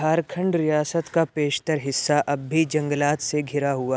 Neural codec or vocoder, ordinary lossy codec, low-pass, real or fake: none; none; none; real